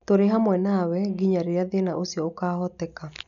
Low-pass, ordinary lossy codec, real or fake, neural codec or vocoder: 7.2 kHz; none; real; none